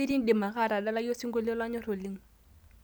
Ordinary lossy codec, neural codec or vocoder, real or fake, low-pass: none; none; real; none